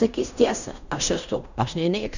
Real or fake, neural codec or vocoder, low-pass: fake; codec, 16 kHz in and 24 kHz out, 0.9 kbps, LongCat-Audio-Codec, fine tuned four codebook decoder; 7.2 kHz